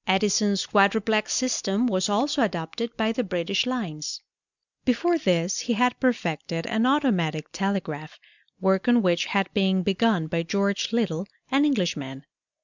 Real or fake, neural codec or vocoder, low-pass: real; none; 7.2 kHz